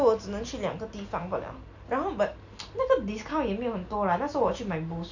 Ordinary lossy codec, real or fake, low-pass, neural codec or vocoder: none; real; 7.2 kHz; none